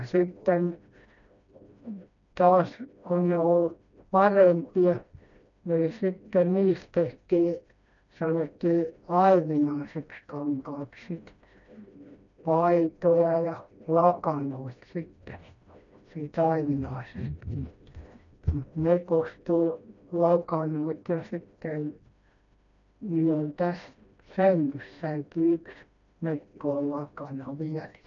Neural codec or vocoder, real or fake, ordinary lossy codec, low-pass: codec, 16 kHz, 1 kbps, FreqCodec, smaller model; fake; none; 7.2 kHz